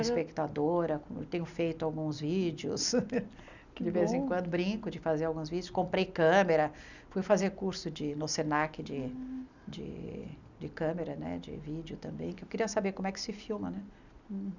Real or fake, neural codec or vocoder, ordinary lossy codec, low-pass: real; none; none; 7.2 kHz